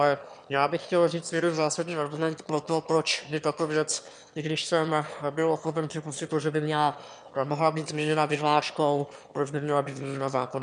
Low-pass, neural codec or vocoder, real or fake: 9.9 kHz; autoencoder, 22.05 kHz, a latent of 192 numbers a frame, VITS, trained on one speaker; fake